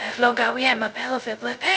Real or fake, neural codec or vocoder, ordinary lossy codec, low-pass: fake; codec, 16 kHz, 0.2 kbps, FocalCodec; none; none